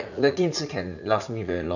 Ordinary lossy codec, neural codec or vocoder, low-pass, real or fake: none; vocoder, 44.1 kHz, 80 mel bands, Vocos; 7.2 kHz; fake